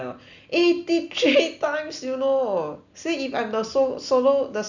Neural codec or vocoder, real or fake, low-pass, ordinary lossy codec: none; real; 7.2 kHz; none